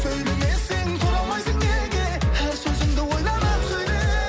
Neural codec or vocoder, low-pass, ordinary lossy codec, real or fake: none; none; none; real